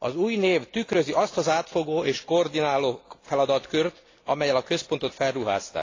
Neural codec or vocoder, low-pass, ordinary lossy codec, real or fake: none; 7.2 kHz; AAC, 32 kbps; real